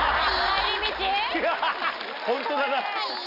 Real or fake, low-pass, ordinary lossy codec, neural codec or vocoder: real; 5.4 kHz; none; none